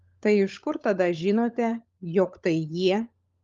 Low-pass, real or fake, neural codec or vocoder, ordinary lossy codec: 7.2 kHz; fake; codec, 16 kHz, 16 kbps, FunCodec, trained on LibriTTS, 50 frames a second; Opus, 32 kbps